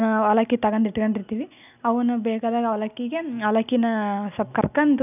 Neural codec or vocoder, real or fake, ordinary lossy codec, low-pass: none; real; none; 3.6 kHz